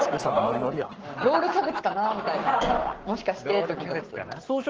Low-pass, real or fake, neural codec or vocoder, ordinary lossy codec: 7.2 kHz; fake; codec, 44.1 kHz, 7.8 kbps, Pupu-Codec; Opus, 16 kbps